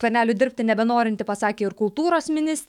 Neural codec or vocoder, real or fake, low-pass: autoencoder, 48 kHz, 128 numbers a frame, DAC-VAE, trained on Japanese speech; fake; 19.8 kHz